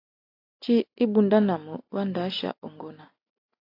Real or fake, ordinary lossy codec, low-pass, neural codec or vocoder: real; AAC, 32 kbps; 5.4 kHz; none